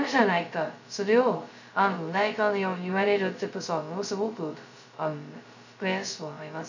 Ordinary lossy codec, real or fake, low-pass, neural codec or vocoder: none; fake; 7.2 kHz; codec, 16 kHz, 0.2 kbps, FocalCodec